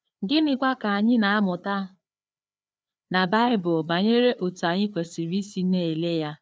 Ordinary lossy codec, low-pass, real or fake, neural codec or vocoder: none; none; fake; codec, 16 kHz, 4 kbps, FreqCodec, larger model